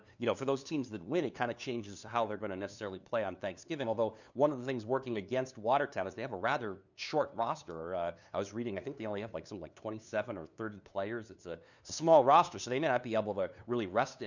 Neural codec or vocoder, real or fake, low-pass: codec, 16 kHz, 2 kbps, FunCodec, trained on LibriTTS, 25 frames a second; fake; 7.2 kHz